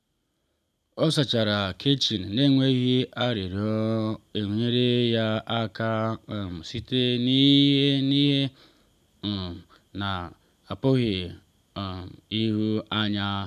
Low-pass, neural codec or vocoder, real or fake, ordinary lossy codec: 14.4 kHz; none; real; none